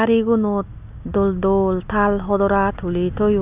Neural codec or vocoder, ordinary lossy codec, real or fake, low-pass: none; Opus, 64 kbps; real; 3.6 kHz